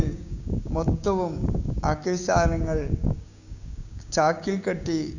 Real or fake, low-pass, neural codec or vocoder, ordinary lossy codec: fake; 7.2 kHz; codec, 16 kHz, 6 kbps, DAC; none